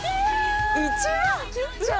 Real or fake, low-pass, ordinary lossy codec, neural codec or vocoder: real; none; none; none